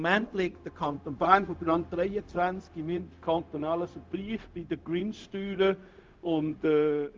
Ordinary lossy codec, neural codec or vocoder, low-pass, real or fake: Opus, 24 kbps; codec, 16 kHz, 0.4 kbps, LongCat-Audio-Codec; 7.2 kHz; fake